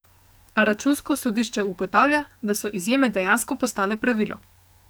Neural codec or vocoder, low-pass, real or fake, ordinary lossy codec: codec, 44.1 kHz, 2.6 kbps, SNAC; none; fake; none